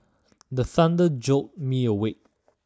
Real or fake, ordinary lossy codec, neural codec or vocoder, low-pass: real; none; none; none